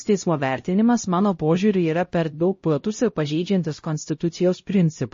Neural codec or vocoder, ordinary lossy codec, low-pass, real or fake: codec, 16 kHz, 0.5 kbps, X-Codec, HuBERT features, trained on LibriSpeech; MP3, 32 kbps; 7.2 kHz; fake